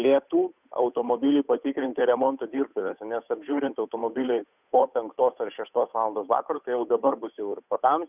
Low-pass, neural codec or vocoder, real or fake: 3.6 kHz; codec, 16 kHz, 8 kbps, FunCodec, trained on Chinese and English, 25 frames a second; fake